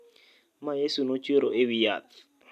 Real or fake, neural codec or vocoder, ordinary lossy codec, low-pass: real; none; MP3, 96 kbps; 14.4 kHz